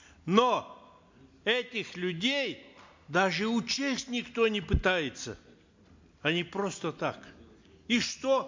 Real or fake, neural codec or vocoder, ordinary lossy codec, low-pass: real; none; MP3, 48 kbps; 7.2 kHz